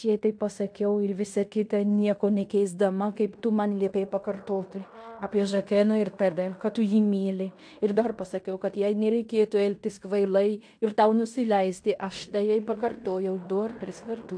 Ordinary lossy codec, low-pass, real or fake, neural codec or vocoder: AAC, 64 kbps; 9.9 kHz; fake; codec, 16 kHz in and 24 kHz out, 0.9 kbps, LongCat-Audio-Codec, fine tuned four codebook decoder